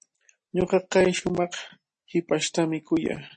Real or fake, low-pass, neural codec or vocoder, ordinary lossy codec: real; 10.8 kHz; none; MP3, 32 kbps